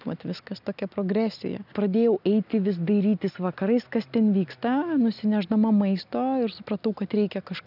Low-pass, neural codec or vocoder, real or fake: 5.4 kHz; none; real